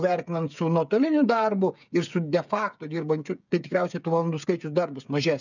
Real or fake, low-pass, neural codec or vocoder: fake; 7.2 kHz; codec, 16 kHz, 8 kbps, FreqCodec, smaller model